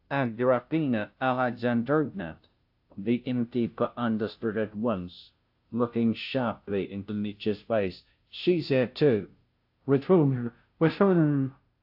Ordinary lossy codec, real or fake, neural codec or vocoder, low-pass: AAC, 48 kbps; fake; codec, 16 kHz, 0.5 kbps, FunCodec, trained on Chinese and English, 25 frames a second; 5.4 kHz